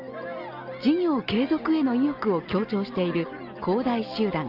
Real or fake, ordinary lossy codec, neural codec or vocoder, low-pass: real; Opus, 32 kbps; none; 5.4 kHz